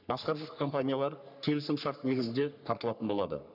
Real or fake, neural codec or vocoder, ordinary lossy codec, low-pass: fake; codec, 44.1 kHz, 3.4 kbps, Pupu-Codec; none; 5.4 kHz